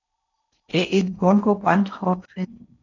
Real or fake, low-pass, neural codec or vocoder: fake; 7.2 kHz; codec, 16 kHz in and 24 kHz out, 0.6 kbps, FocalCodec, streaming, 4096 codes